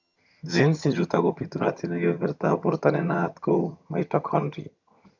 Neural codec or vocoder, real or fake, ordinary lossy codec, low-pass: vocoder, 22.05 kHz, 80 mel bands, HiFi-GAN; fake; none; 7.2 kHz